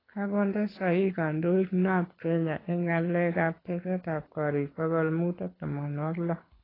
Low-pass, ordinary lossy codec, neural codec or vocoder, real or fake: 5.4 kHz; AAC, 24 kbps; codec, 24 kHz, 6 kbps, HILCodec; fake